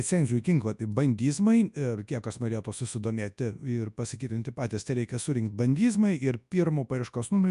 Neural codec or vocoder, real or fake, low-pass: codec, 24 kHz, 0.9 kbps, WavTokenizer, large speech release; fake; 10.8 kHz